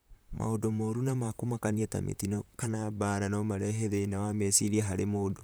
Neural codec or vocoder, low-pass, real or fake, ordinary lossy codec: vocoder, 44.1 kHz, 128 mel bands, Pupu-Vocoder; none; fake; none